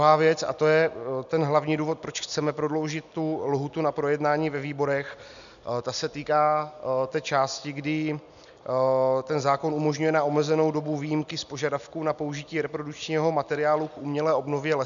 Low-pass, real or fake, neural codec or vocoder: 7.2 kHz; real; none